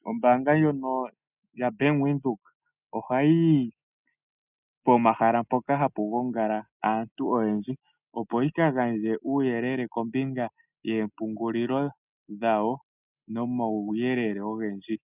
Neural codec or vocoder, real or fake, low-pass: none; real; 3.6 kHz